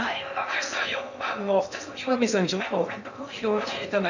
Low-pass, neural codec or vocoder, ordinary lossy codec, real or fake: 7.2 kHz; codec, 16 kHz in and 24 kHz out, 0.6 kbps, FocalCodec, streaming, 2048 codes; none; fake